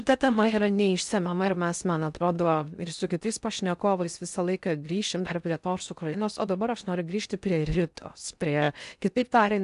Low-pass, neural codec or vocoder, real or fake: 10.8 kHz; codec, 16 kHz in and 24 kHz out, 0.6 kbps, FocalCodec, streaming, 4096 codes; fake